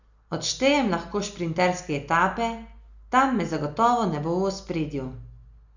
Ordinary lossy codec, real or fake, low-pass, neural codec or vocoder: none; real; 7.2 kHz; none